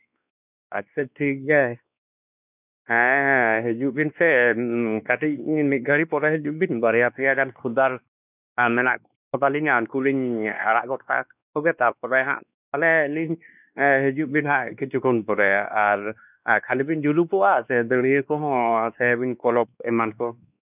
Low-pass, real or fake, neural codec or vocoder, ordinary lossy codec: 3.6 kHz; fake; codec, 16 kHz, 2 kbps, X-Codec, WavLM features, trained on Multilingual LibriSpeech; none